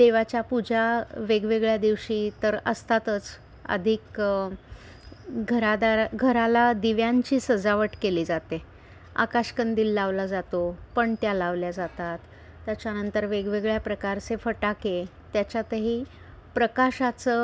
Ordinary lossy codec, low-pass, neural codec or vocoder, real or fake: none; none; none; real